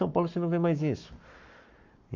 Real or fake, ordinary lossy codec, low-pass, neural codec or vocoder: fake; none; 7.2 kHz; codec, 44.1 kHz, 7.8 kbps, Pupu-Codec